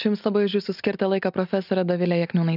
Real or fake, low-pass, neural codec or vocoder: real; 5.4 kHz; none